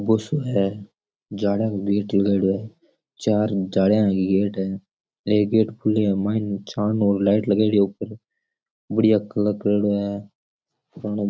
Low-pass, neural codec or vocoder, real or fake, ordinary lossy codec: none; none; real; none